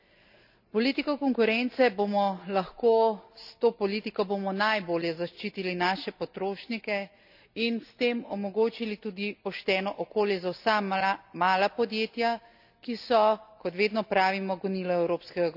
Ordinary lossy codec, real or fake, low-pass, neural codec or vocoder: none; real; 5.4 kHz; none